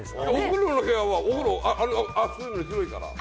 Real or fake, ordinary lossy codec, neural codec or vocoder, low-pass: real; none; none; none